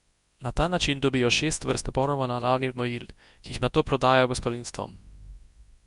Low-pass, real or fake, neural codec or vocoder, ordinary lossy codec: 10.8 kHz; fake; codec, 24 kHz, 0.9 kbps, WavTokenizer, large speech release; Opus, 64 kbps